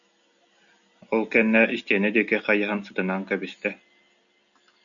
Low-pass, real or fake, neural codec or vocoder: 7.2 kHz; real; none